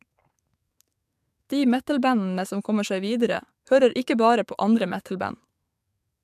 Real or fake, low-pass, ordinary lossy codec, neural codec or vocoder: fake; 14.4 kHz; MP3, 96 kbps; codec, 44.1 kHz, 7.8 kbps, DAC